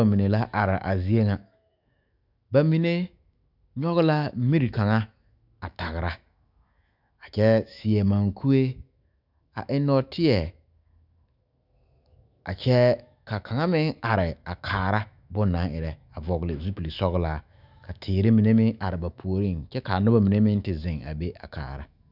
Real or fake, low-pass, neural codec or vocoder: fake; 5.4 kHz; autoencoder, 48 kHz, 128 numbers a frame, DAC-VAE, trained on Japanese speech